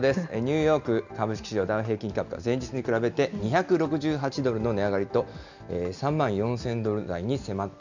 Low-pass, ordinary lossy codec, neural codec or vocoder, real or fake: 7.2 kHz; none; none; real